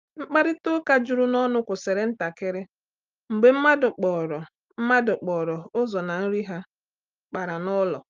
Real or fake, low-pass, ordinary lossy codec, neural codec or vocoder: real; 7.2 kHz; Opus, 24 kbps; none